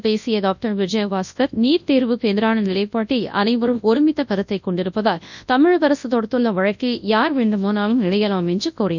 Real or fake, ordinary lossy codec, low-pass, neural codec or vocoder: fake; none; 7.2 kHz; codec, 24 kHz, 0.9 kbps, WavTokenizer, large speech release